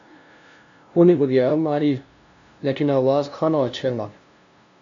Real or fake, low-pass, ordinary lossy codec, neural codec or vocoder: fake; 7.2 kHz; AAC, 48 kbps; codec, 16 kHz, 0.5 kbps, FunCodec, trained on LibriTTS, 25 frames a second